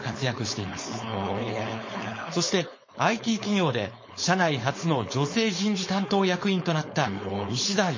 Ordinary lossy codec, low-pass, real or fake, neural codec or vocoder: MP3, 32 kbps; 7.2 kHz; fake; codec, 16 kHz, 4.8 kbps, FACodec